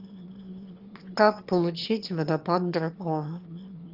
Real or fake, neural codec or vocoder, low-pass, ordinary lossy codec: fake; autoencoder, 22.05 kHz, a latent of 192 numbers a frame, VITS, trained on one speaker; 5.4 kHz; Opus, 24 kbps